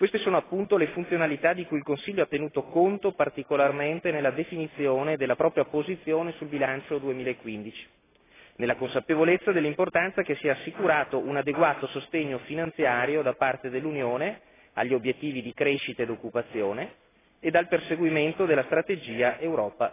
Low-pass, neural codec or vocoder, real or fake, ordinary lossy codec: 3.6 kHz; none; real; AAC, 16 kbps